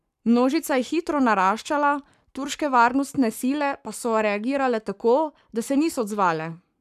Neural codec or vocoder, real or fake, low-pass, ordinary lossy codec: codec, 44.1 kHz, 7.8 kbps, Pupu-Codec; fake; 14.4 kHz; none